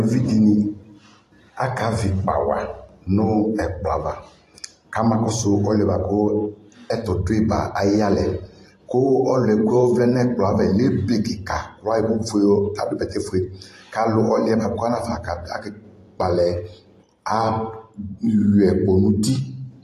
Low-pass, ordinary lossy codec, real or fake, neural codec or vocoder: 14.4 kHz; AAC, 48 kbps; fake; vocoder, 44.1 kHz, 128 mel bands every 512 samples, BigVGAN v2